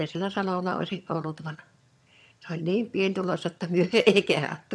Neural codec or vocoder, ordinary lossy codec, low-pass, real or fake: vocoder, 22.05 kHz, 80 mel bands, HiFi-GAN; none; none; fake